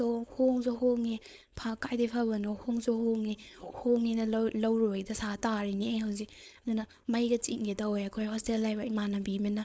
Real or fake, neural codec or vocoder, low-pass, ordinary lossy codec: fake; codec, 16 kHz, 4.8 kbps, FACodec; none; none